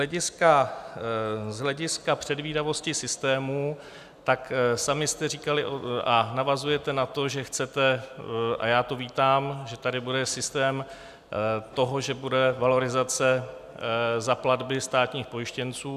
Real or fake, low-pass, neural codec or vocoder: real; 14.4 kHz; none